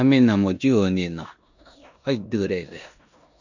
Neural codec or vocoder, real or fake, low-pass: codec, 16 kHz in and 24 kHz out, 0.9 kbps, LongCat-Audio-Codec, four codebook decoder; fake; 7.2 kHz